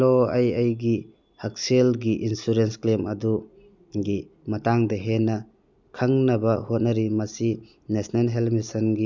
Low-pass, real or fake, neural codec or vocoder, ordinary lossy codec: 7.2 kHz; real; none; none